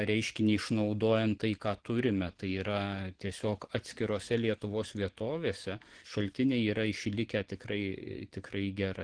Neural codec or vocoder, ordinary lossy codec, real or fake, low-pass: codec, 44.1 kHz, 7.8 kbps, DAC; Opus, 16 kbps; fake; 9.9 kHz